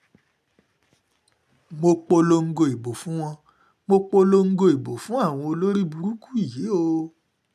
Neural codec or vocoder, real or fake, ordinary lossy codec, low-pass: none; real; none; 14.4 kHz